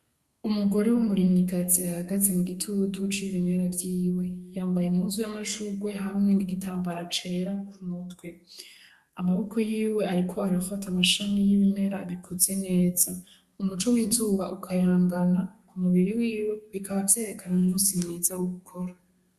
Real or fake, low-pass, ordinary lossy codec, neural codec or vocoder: fake; 14.4 kHz; Opus, 64 kbps; codec, 32 kHz, 1.9 kbps, SNAC